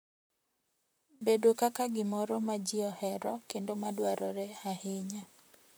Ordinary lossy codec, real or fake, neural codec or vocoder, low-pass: none; fake; vocoder, 44.1 kHz, 128 mel bands, Pupu-Vocoder; none